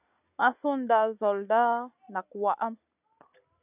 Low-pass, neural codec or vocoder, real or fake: 3.6 kHz; none; real